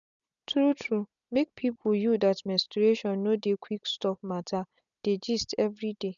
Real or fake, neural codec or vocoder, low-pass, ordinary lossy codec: real; none; 7.2 kHz; none